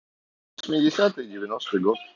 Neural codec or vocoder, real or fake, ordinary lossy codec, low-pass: none; real; none; 7.2 kHz